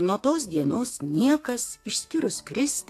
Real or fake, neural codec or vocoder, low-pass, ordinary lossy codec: fake; codec, 32 kHz, 1.9 kbps, SNAC; 14.4 kHz; AAC, 64 kbps